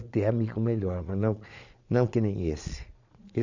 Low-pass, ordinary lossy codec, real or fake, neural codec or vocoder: 7.2 kHz; none; fake; vocoder, 22.05 kHz, 80 mel bands, Vocos